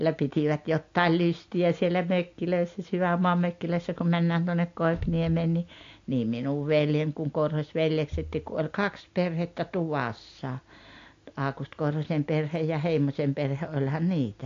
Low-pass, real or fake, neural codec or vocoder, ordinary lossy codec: 7.2 kHz; real; none; AAC, 48 kbps